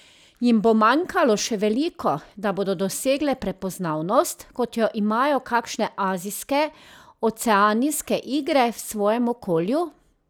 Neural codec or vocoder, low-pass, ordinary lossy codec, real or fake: none; none; none; real